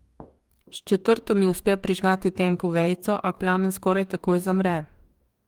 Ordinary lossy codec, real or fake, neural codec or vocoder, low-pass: Opus, 32 kbps; fake; codec, 44.1 kHz, 2.6 kbps, DAC; 19.8 kHz